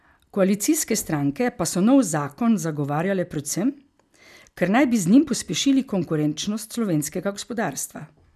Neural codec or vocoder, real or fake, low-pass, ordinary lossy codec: none; real; 14.4 kHz; none